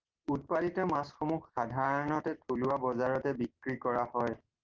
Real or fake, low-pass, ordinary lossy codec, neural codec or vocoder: real; 7.2 kHz; Opus, 16 kbps; none